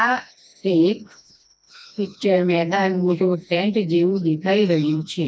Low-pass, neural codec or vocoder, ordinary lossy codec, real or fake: none; codec, 16 kHz, 1 kbps, FreqCodec, smaller model; none; fake